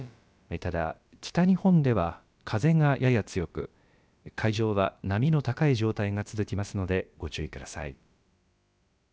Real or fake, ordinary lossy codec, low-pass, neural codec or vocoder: fake; none; none; codec, 16 kHz, about 1 kbps, DyCAST, with the encoder's durations